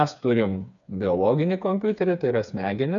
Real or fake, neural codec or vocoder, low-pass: fake; codec, 16 kHz, 4 kbps, FreqCodec, smaller model; 7.2 kHz